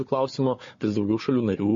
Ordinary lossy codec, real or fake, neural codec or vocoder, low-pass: MP3, 32 kbps; fake; codec, 16 kHz, 4 kbps, FunCodec, trained on Chinese and English, 50 frames a second; 7.2 kHz